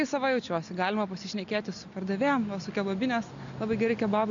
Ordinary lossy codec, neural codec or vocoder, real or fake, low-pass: AAC, 64 kbps; none; real; 7.2 kHz